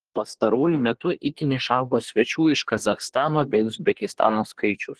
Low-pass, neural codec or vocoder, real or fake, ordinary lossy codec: 10.8 kHz; codec, 24 kHz, 1 kbps, SNAC; fake; Opus, 16 kbps